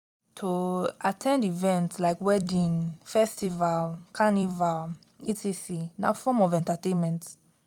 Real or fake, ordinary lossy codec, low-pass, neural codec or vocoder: fake; none; 19.8 kHz; vocoder, 44.1 kHz, 128 mel bands every 256 samples, BigVGAN v2